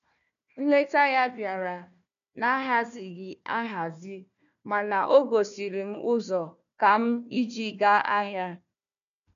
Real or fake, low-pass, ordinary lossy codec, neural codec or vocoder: fake; 7.2 kHz; none; codec, 16 kHz, 1 kbps, FunCodec, trained on Chinese and English, 50 frames a second